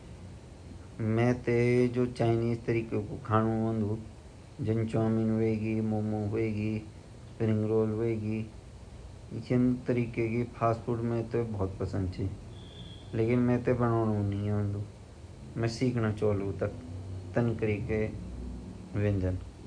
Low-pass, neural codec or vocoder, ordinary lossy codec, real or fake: 9.9 kHz; none; none; real